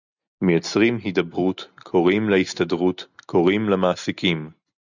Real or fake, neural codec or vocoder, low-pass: real; none; 7.2 kHz